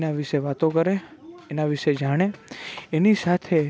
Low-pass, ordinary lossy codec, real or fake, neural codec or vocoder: none; none; real; none